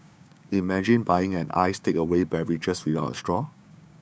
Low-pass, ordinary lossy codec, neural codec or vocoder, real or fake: none; none; codec, 16 kHz, 6 kbps, DAC; fake